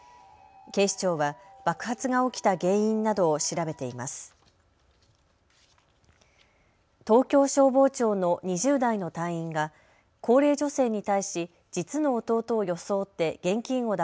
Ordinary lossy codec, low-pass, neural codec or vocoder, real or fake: none; none; none; real